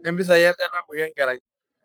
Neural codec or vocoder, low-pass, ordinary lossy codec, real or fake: codec, 44.1 kHz, 3.4 kbps, Pupu-Codec; none; none; fake